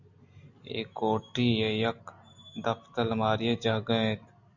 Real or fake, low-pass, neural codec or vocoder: real; 7.2 kHz; none